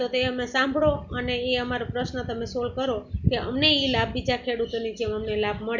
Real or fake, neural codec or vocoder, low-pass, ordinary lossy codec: real; none; 7.2 kHz; none